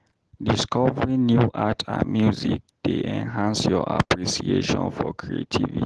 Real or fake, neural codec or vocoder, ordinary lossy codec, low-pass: real; none; Opus, 16 kbps; 10.8 kHz